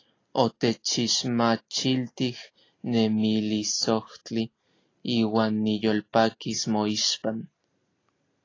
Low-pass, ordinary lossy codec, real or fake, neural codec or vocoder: 7.2 kHz; AAC, 32 kbps; real; none